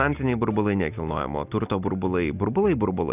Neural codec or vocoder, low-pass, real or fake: none; 3.6 kHz; real